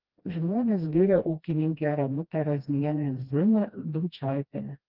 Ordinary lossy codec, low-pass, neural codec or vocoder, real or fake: Opus, 32 kbps; 5.4 kHz; codec, 16 kHz, 1 kbps, FreqCodec, smaller model; fake